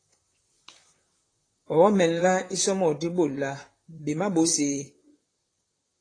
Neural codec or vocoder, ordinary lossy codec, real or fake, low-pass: vocoder, 44.1 kHz, 128 mel bands, Pupu-Vocoder; AAC, 32 kbps; fake; 9.9 kHz